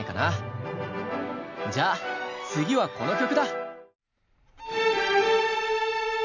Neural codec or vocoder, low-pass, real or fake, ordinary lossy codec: none; 7.2 kHz; real; none